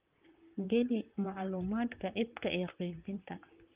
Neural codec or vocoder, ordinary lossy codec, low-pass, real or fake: vocoder, 44.1 kHz, 128 mel bands, Pupu-Vocoder; Opus, 32 kbps; 3.6 kHz; fake